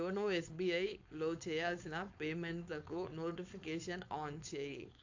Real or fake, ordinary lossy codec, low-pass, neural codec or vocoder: fake; AAC, 48 kbps; 7.2 kHz; codec, 16 kHz, 4.8 kbps, FACodec